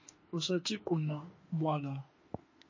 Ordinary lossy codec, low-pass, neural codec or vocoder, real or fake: MP3, 32 kbps; 7.2 kHz; autoencoder, 48 kHz, 32 numbers a frame, DAC-VAE, trained on Japanese speech; fake